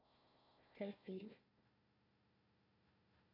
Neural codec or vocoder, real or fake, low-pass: codec, 16 kHz, 1 kbps, FunCodec, trained on Chinese and English, 50 frames a second; fake; 5.4 kHz